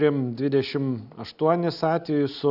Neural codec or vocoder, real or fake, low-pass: none; real; 5.4 kHz